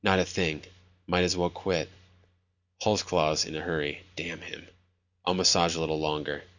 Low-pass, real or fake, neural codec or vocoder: 7.2 kHz; real; none